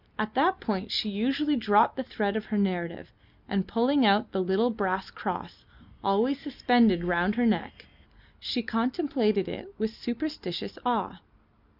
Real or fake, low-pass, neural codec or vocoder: real; 5.4 kHz; none